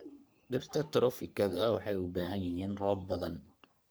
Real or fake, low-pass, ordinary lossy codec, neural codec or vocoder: fake; none; none; codec, 44.1 kHz, 3.4 kbps, Pupu-Codec